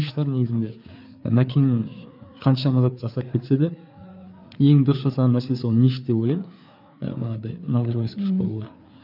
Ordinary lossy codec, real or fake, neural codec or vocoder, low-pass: none; fake; codec, 16 kHz, 4 kbps, FreqCodec, larger model; 5.4 kHz